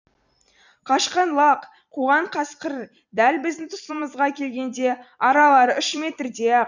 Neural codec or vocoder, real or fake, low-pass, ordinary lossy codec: vocoder, 44.1 kHz, 128 mel bands every 256 samples, BigVGAN v2; fake; 7.2 kHz; none